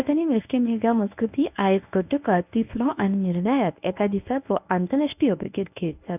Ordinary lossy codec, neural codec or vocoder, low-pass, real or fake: none; codec, 24 kHz, 0.9 kbps, WavTokenizer, medium speech release version 1; 3.6 kHz; fake